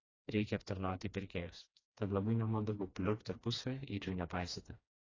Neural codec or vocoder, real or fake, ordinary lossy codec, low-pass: codec, 16 kHz, 2 kbps, FreqCodec, smaller model; fake; AAC, 32 kbps; 7.2 kHz